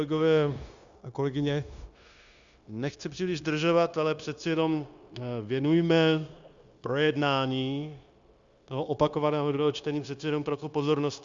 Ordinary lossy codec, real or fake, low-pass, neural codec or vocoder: Opus, 64 kbps; fake; 7.2 kHz; codec, 16 kHz, 0.9 kbps, LongCat-Audio-Codec